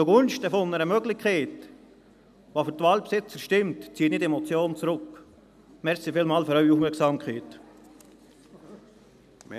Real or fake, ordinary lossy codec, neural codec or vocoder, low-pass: real; none; none; 14.4 kHz